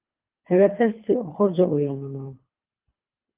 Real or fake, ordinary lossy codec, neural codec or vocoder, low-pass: fake; Opus, 32 kbps; codec, 24 kHz, 3 kbps, HILCodec; 3.6 kHz